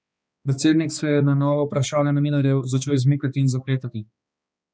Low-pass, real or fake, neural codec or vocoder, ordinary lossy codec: none; fake; codec, 16 kHz, 2 kbps, X-Codec, HuBERT features, trained on balanced general audio; none